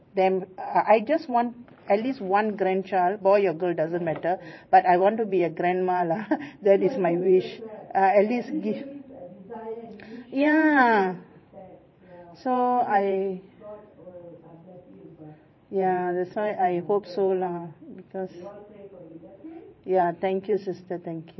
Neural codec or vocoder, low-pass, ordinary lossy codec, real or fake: vocoder, 44.1 kHz, 128 mel bands every 512 samples, BigVGAN v2; 7.2 kHz; MP3, 24 kbps; fake